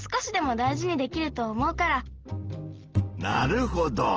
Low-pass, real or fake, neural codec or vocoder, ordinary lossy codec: 7.2 kHz; real; none; Opus, 16 kbps